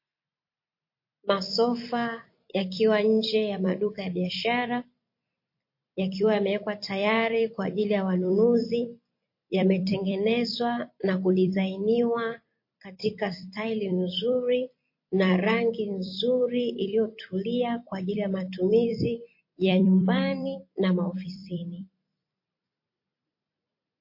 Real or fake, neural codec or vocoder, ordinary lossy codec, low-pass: fake; vocoder, 44.1 kHz, 128 mel bands every 256 samples, BigVGAN v2; MP3, 32 kbps; 5.4 kHz